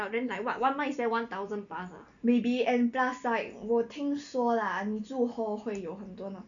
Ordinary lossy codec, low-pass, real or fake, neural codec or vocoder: none; 7.2 kHz; real; none